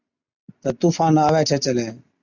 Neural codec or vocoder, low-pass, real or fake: none; 7.2 kHz; real